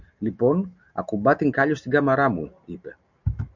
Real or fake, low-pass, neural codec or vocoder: real; 7.2 kHz; none